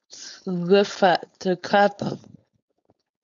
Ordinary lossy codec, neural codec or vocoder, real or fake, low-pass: MP3, 64 kbps; codec, 16 kHz, 4.8 kbps, FACodec; fake; 7.2 kHz